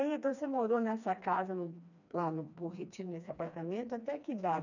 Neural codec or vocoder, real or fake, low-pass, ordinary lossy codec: codec, 16 kHz, 2 kbps, FreqCodec, smaller model; fake; 7.2 kHz; none